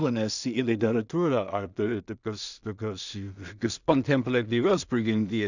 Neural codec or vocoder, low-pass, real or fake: codec, 16 kHz in and 24 kHz out, 0.4 kbps, LongCat-Audio-Codec, two codebook decoder; 7.2 kHz; fake